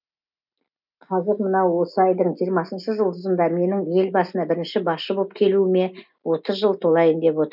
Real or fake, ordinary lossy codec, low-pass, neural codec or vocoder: real; none; 5.4 kHz; none